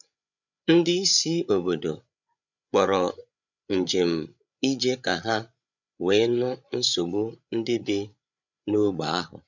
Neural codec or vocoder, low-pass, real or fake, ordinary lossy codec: codec, 16 kHz, 8 kbps, FreqCodec, larger model; 7.2 kHz; fake; none